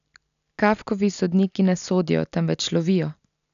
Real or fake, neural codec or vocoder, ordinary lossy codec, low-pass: real; none; none; 7.2 kHz